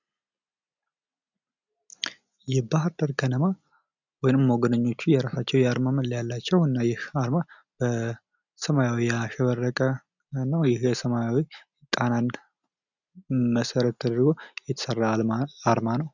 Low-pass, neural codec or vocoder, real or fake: 7.2 kHz; none; real